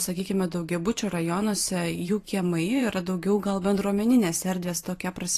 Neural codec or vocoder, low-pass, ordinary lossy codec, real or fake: vocoder, 44.1 kHz, 128 mel bands every 512 samples, BigVGAN v2; 14.4 kHz; AAC, 48 kbps; fake